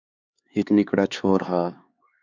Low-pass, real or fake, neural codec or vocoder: 7.2 kHz; fake; codec, 16 kHz, 4 kbps, X-Codec, HuBERT features, trained on LibriSpeech